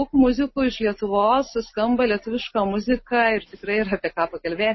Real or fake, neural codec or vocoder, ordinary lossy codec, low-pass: real; none; MP3, 24 kbps; 7.2 kHz